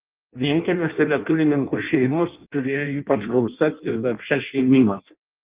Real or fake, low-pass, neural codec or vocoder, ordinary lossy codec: fake; 3.6 kHz; codec, 16 kHz in and 24 kHz out, 0.6 kbps, FireRedTTS-2 codec; Opus, 32 kbps